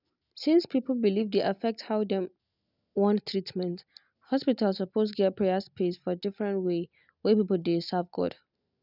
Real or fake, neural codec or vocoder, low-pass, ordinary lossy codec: real; none; 5.4 kHz; none